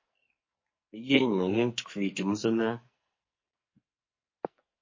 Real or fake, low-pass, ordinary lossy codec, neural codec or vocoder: fake; 7.2 kHz; MP3, 32 kbps; codec, 44.1 kHz, 2.6 kbps, SNAC